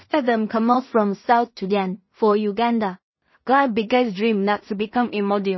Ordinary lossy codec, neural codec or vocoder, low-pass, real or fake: MP3, 24 kbps; codec, 16 kHz in and 24 kHz out, 0.4 kbps, LongCat-Audio-Codec, two codebook decoder; 7.2 kHz; fake